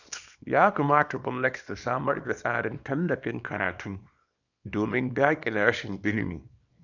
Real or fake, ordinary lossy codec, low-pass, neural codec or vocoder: fake; none; 7.2 kHz; codec, 24 kHz, 0.9 kbps, WavTokenizer, small release